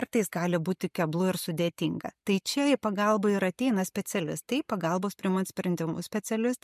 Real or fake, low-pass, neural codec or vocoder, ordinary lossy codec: real; 14.4 kHz; none; MP3, 96 kbps